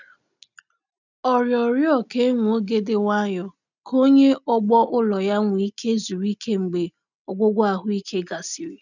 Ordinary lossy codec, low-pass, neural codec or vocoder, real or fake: none; 7.2 kHz; none; real